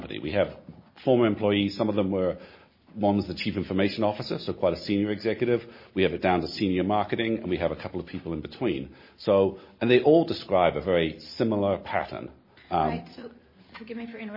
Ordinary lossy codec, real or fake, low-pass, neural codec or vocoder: MP3, 24 kbps; real; 5.4 kHz; none